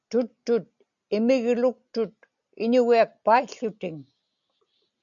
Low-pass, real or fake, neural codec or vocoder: 7.2 kHz; real; none